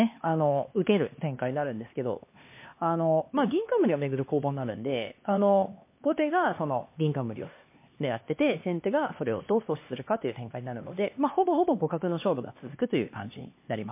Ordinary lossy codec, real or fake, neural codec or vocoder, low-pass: MP3, 24 kbps; fake; codec, 16 kHz, 2 kbps, X-Codec, HuBERT features, trained on LibriSpeech; 3.6 kHz